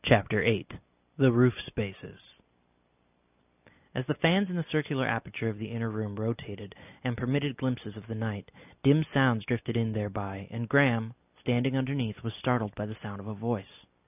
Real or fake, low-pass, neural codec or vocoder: real; 3.6 kHz; none